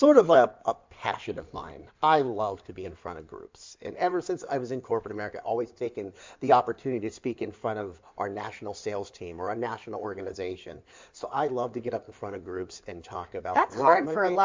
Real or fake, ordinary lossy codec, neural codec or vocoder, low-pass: fake; MP3, 64 kbps; codec, 16 kHz in and 24 kHz out, 2.2 kbps, FireRedTTS-2 codec; 7.2 kHz